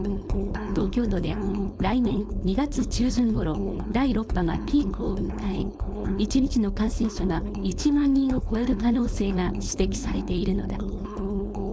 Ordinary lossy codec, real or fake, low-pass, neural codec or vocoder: none; fake; none; codec, 16 kHz, 4.8 kbps, FACodec